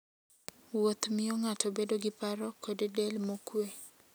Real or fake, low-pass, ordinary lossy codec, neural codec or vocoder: real; none; none; none